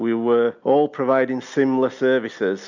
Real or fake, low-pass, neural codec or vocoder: real; 7.2 kHz; none